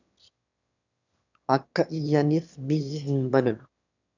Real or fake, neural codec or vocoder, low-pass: fake; autoencoder, 22.05 kHz, a latent of 192 numbers a frame, VITS, trained on one speaker; 7.2 kHz